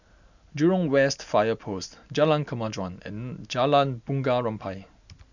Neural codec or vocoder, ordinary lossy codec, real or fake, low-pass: none; none; real; 7.2 kHz